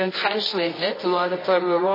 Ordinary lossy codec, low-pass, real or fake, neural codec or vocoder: MP3, 24 kbps; 5.4 kHz; fake; codec, 24 kHz, 0.9 kbps, WavTokenizer, medium music audio release